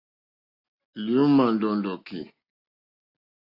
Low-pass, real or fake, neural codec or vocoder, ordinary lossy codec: 5.4 kHz; real; none; AAC, 32 kbps